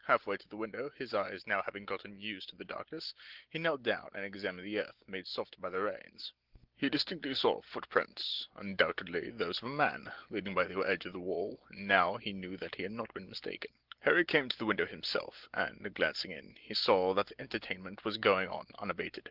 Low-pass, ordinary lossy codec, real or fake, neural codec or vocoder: 5.4 kHz; Opus, 16 kbps; real; none